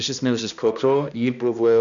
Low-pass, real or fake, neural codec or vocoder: 7.2 kHz; fake; codec, 16 kHz, 1 kbps, X-Codec, HuBERT features, trained on balanced general audio